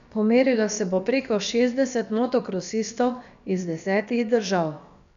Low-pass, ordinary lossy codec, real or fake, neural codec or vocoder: 7.2 kHz; none; fake; codec, 16 kHz, about 1 kbps, DyCAST, with the encoder's durations